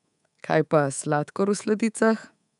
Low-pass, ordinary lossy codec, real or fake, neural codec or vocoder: 10.8 kHz; none; fake; codec, 24 kHz, 3.1 kbps, DualCodec